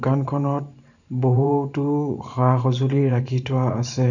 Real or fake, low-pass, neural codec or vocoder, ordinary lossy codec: fake; 7.2 kHz; vocoder, 44.1 kHz, 128 mel bands every 512 samples, BigVGAN v2; none